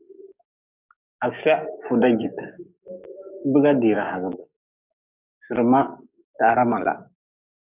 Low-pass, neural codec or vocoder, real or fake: 3.6 kHz; codec, 44.1 kHz, 7.8 kbps, DAC; fake